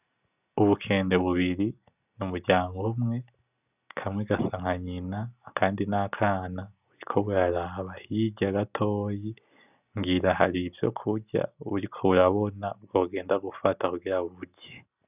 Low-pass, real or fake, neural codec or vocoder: 3.6 kHz; real; none